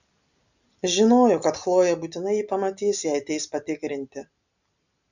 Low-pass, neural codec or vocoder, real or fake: 7.2 kHz; none; real